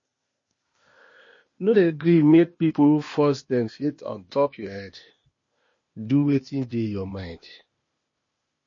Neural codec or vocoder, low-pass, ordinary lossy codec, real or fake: codec, 16 kHz, 0.8 kbps, ZipCodec; 7.2 kHz; MP3, 32 kbps; fake